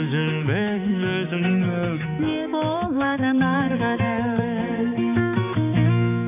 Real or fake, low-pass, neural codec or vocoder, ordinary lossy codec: fake; 3.6 kHz; codec, 16 kHz, 4 kbps, X-Codec, HuBERT features, trained on balanced general audio; AAC, 32 kbps